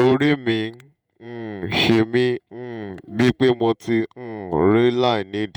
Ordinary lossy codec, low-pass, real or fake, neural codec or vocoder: none; 19.8 kHz; fake; vocoder, 48 kHz, 128 mel bands, Vocos